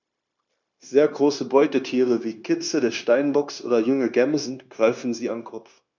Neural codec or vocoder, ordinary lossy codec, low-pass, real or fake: codec, 16 kHz, 0.9 kbps, LongCat-Audio-Codec; none; 7.2 kHz; fake